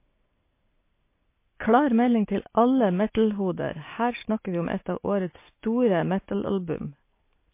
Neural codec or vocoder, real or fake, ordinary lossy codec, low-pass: none; real; MP3, 24 kbps; 3.6 kHz